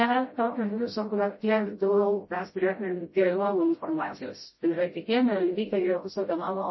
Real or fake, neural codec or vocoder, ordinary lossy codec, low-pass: fake; codec, 16 kHz, 0.5 kbps, FreqCodec, smaller model; MP3, 24 kbps; 7.2 kHz